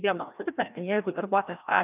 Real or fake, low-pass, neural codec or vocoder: fake; 3.6 kHz; codec, 16 kHz, 1 kbps, FreqCodec, larger model